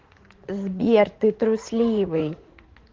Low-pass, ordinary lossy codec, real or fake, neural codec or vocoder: 7.2 kHz; Opus, 16 kbps; fake; vocoder, 44.1 kHz, 80 mel bands, Vocos